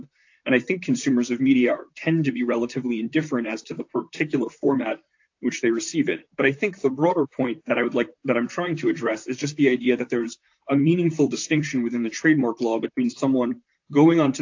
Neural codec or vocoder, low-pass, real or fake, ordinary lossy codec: vocoder, 44.1 kHz, 128 mel bands, Pupu-Vocoder; 7.2 kHz; fake; AAC, 48 kbps